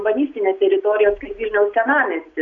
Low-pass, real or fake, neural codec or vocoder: 7.2 kHz; real; none